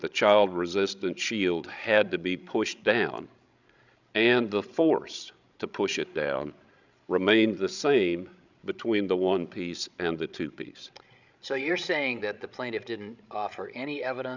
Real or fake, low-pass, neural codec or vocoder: fake; 7.2 kHz; codec, 16 kHz, 16 kbps, FreqCodec, larger model